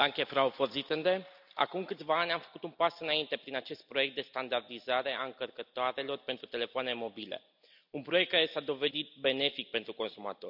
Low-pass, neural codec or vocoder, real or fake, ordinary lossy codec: 5.4 kHz; none; real; none